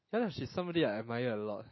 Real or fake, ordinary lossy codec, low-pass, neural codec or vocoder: real; MP3, 24 kbps; 7.2 kHz; none